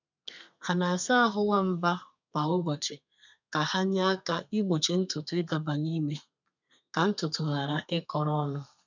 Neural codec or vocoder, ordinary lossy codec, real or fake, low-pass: codec, 32 kHz, 1.9 kbps, SNAC; none; fake; 7.2 kHz